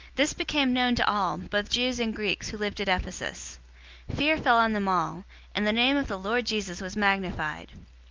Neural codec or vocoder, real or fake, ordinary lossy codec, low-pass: none; real; Opus, 24 kbps; 7.2 kHz